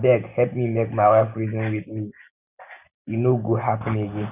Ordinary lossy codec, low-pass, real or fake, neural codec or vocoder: none; 3.6 kHz; real; none